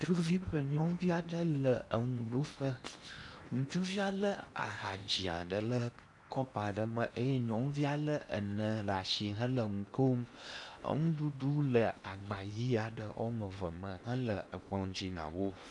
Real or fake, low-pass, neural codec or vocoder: fake; 10.8 kHz; codec, 16 kHz in and 24 kHz out, 0.8 kbps, FocalCodec, streaming, 65536 codes